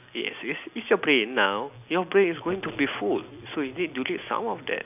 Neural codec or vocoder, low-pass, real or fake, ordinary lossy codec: none; 3.6 kHz; real; none